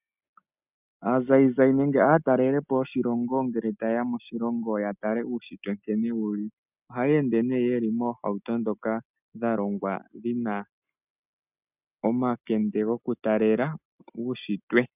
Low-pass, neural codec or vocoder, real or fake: 3.6 kHz; none; real